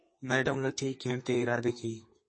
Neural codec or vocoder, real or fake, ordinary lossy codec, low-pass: codec, 32 kHz, 1.9 kbps, SNAC; fake; MP3, 32 kbps; 9.9 kHz